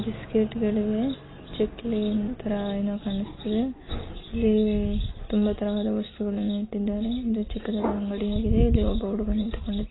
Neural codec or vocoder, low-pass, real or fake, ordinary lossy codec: none; 7.2 kHz; real; AAC, 16 kbps